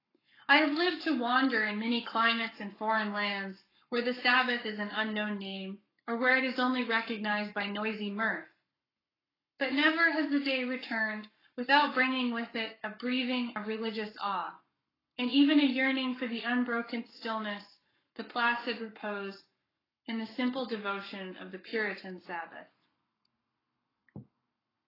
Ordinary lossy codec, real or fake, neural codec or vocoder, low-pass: AAC, 24 kbps; fake; codec, 44.1 kHz, 7.8 kbps, Pupu-Codec; 5.4 kHz